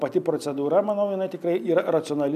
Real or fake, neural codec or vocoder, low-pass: real; none; 14.4 kHz